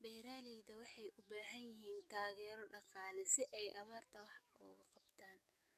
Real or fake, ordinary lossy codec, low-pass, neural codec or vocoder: fake; none; 14.4 kHz; codec, 44.1 kHz, 7.8 kbps, DAC